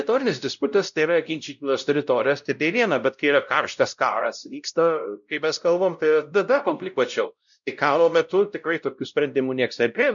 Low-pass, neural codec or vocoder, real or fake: 7.2 kHz; codec, 16 kHz, 0.5 kbps, X-Codec, WavLM features, trained on Multilingual LibriSpeech; fake